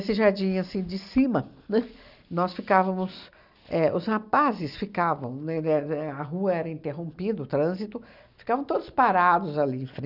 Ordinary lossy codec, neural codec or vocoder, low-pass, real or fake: none; none; 5.4 kHz; real